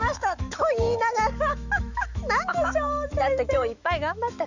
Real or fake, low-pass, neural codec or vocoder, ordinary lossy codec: fake; 7.2 kHz; autoencoder, 48 kHz, 128 numbers a frame, DAC-VAE, trained on Japanese speech; none